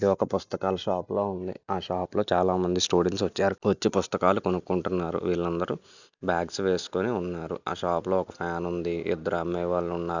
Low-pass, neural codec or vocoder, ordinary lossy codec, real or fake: 7.2 kHz; none; none; real